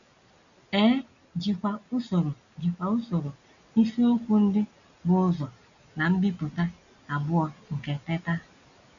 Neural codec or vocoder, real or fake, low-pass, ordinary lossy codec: none; real; 7.2 kHz; none